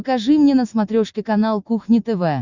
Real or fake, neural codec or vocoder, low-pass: real; none; 7.2 kHz